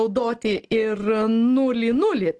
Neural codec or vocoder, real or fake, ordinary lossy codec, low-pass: none; real; Opus, 16 kbps; 10.8 kHz